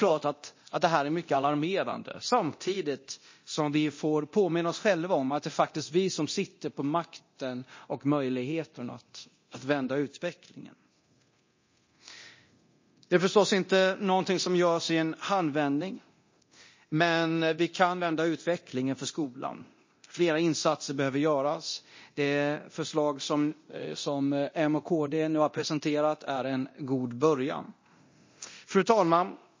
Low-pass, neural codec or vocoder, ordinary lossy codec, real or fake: 7.2 kHz; codec, 24 kHz, 0.9 kbps, DualCodec; MP3, 32 kbps; fake